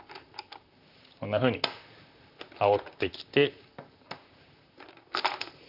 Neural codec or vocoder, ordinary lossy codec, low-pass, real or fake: vocoder, 44.1 kHz, 128 mel bands, Pupu-Vocoder; none; 5.4 kHz; fake